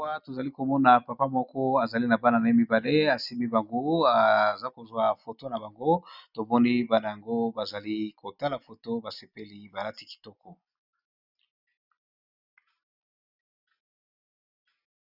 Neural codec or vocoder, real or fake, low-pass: none; real; 5.4 kHz